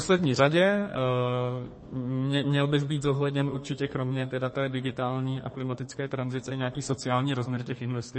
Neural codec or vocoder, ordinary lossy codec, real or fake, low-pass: codec, 32 kHz, 1.9 kbps, SNAC; MP3, 32 kbps; fake; 10.8 kHz